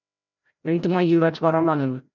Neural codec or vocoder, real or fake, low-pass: codec, 16 kHz, 0.5 kbps, FreqCodec, larger model; fake; 7.2 kHz